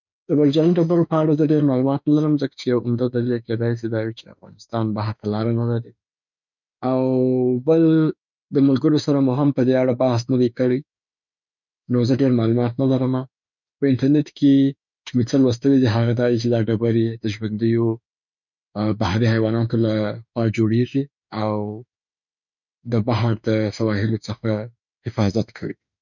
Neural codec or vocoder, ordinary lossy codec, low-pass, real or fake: autoencoder, 48 kHz, 32 numbers a frame, DAC-VAE, trained on Japanese speech; none; 7.2 kHz; fake